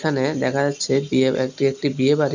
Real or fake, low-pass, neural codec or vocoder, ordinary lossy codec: real; 7.2 kHz; none; none